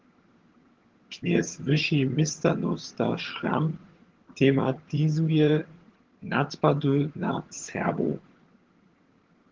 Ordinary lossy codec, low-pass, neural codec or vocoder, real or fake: Opus, 16 kbps; 7.2 kHz; vocoder, 22.05 kHz, 80 mel bands, HiFi-GAN; fake